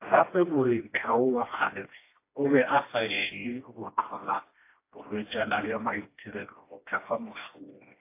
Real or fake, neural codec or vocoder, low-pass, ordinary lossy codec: fake; codec, 16 kHz, 1 kbps, FreqCodec, smaller model; 3.6 kHz; AAC, 24 kbps